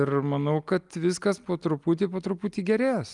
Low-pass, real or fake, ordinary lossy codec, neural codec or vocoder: 10.8 kHz; real; Opus, 32 kbps; none